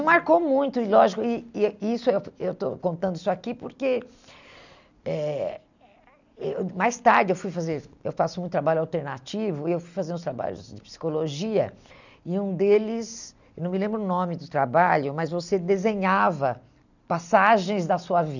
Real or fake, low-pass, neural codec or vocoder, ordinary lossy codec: real; 7.2 kHz; none; none